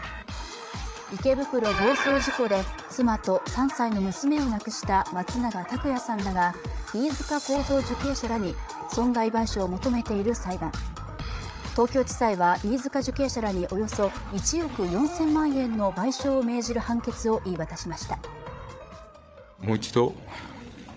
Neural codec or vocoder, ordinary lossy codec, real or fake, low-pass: codec, 16 kHz, 8 kbps, FreqCodec, larger model; none; fake; none